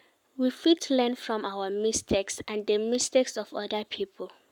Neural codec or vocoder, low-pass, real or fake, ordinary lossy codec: codec, 44.1 kHz, 7.8 kbps, Pupu-Codec; 19.8 kHz; fake; none